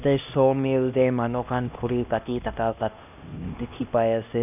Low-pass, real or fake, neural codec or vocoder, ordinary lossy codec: 3.6 kHz; fake; codec, 16 kHz, 1 kbps, X-Codec, HuBERT features, trained on LibriSpeech; none